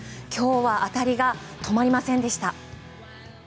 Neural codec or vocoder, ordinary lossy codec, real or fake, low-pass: none; none; real; none